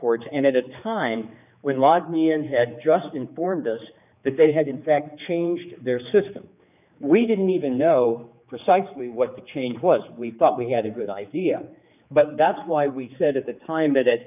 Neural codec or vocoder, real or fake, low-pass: codec, 16 kHz, 4 kbps, X-Codec, HuBERT features, trained on general audio; fake; 3.6 kHz